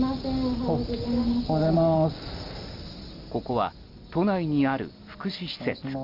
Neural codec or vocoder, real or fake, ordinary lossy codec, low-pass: none; real; Opus, 32 kbps; 5.4 kHz